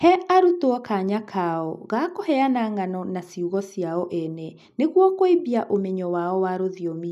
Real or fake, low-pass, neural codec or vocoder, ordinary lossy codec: real; 14.4 kHz; none; none